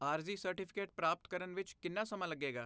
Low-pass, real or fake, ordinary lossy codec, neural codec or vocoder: none; real; none; none